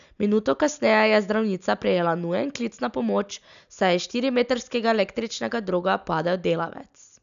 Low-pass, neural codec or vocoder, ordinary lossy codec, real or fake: 7.2 kHz; none; none; real